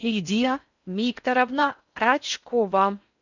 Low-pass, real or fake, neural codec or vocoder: 7.2 kHz; fake; codec, 16 kHz in and 24 kHz out, 0.6 kbps, FocalCodec, streaming, 4096 codes